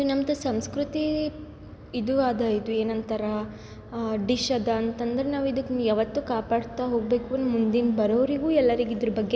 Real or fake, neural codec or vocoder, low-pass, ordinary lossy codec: real; none; none; none